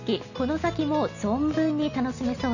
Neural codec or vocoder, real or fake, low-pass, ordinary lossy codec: none; real; 7.2 kHz; none